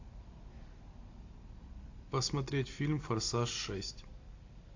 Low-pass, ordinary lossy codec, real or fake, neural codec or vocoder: 7.2 kHz; AAC, 48 kbps; real; none